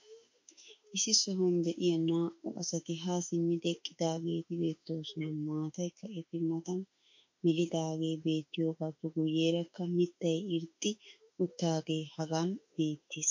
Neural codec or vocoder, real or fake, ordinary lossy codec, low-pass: autoencoder, 48 kHz, 32 numbers a frame, DAC-VAE, trained on Japanese speech; fake; MP3, 48 kbps; 7.2 kHz